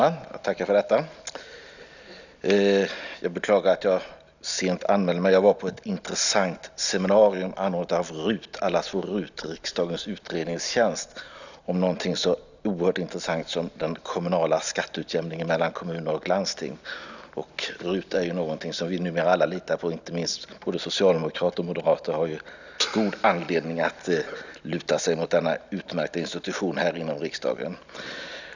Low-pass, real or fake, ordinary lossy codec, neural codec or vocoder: 7.2 kHz; real; none; none